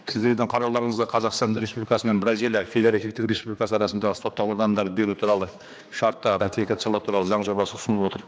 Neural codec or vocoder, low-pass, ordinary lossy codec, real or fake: codec, 16 kHz, 2 kbps, X-Codec, HuBERT features, trained on general audio; none; none; fake